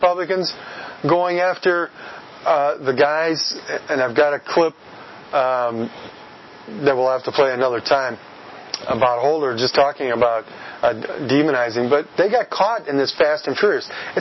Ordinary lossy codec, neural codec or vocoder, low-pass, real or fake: MP3, 24 kbps; none; 7.2 kHz; real